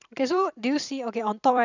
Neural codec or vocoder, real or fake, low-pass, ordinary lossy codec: vocoder, 44.1 kHz, 128 mel bands every 256 samples, BigVGAN v2; fake; 7.2 kHz; none